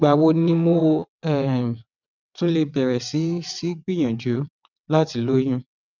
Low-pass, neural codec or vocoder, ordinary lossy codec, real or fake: 7.2 kHz; vocoder, 22.05 kHz, 80 mel bands, WaveNeXt; none; fake